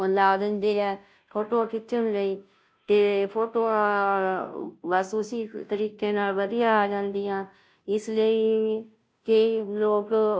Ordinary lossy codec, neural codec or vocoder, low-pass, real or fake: none; codec, 16 kHz, 0.5 kbps, FunCodec, trained on Chinese and English, 25 frames a second; none; fake